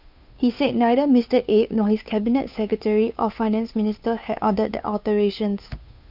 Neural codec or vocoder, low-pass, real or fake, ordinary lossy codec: codec, 16 kHz, 2 kbps, FunCodec, trained on Chinese and English, 25 frames a second; 5.4 kHz; fake; none